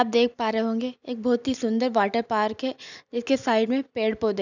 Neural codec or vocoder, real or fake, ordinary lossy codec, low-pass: none; real; none; 7.2 kHz